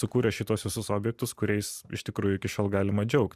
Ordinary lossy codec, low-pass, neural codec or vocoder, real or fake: Opus, 64 kbps; 14.4 kHz; vocoder, 44.1 kHz, 128 mel bands every 512 samples, BigVGAN v2; fake